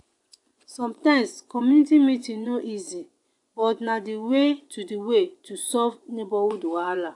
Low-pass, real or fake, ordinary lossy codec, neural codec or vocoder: 10.8 kHz; fake; AAC, 64 kbps; vocoder, 24 kHz, 100 mel bands, Vocos